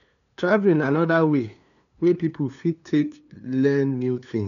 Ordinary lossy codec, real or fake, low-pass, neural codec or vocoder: none; fake; 7.2 kHz; codec, 16 kHz, 2 kbps, FunCodec, trained on LibriTTS, 25 frames a second